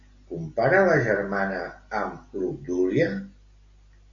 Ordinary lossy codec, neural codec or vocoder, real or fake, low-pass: AAC, 48 kbps; none; real; 7.2 kHz